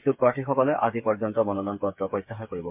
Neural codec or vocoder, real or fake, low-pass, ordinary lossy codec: codec, 16 kHz, 8 kbps, FreqCodec, smaller model; fake; 3.6 kHz; MP3, 32 kbps